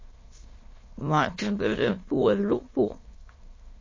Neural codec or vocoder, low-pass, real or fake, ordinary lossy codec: autoencoder, 22.05 kHz, a latent of 192 numbers a frame, VITS, trained on many speakers; 7.2 kHz; fake; MP3, 32 kbps